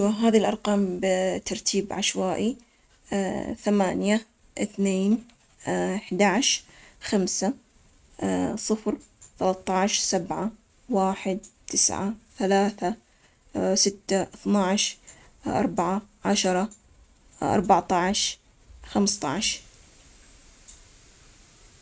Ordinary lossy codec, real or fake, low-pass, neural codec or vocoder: none; real; none; none